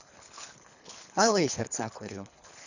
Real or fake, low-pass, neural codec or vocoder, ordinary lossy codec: fake; 7.2 kHz; codec, 24 kHz, 3 kbps, HILCodec; none